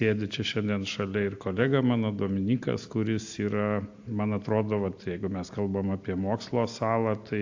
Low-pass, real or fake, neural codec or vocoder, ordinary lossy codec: 7.2 kHz; real; none; AAC, 48 kbps